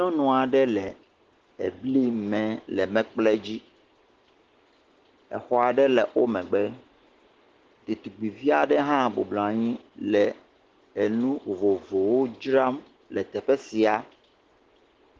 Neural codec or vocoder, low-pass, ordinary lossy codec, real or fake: none; 7.2 kHz; Opus, 16 kbps; real